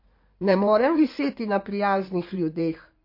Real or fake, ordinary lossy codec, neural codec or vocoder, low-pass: fake; MP3, 32 kbps; codec, 16 kHz in and 24 kHz out, 2.2 kbps, FireRedTTS-2 codec; 5.4 kHz